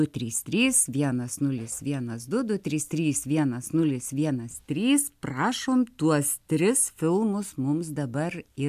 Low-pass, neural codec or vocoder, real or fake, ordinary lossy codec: 14.4 kHz; none; real; AAC, 96 kbps